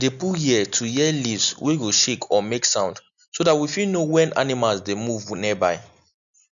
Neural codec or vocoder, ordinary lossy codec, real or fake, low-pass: none; none; real; 7.2 kHz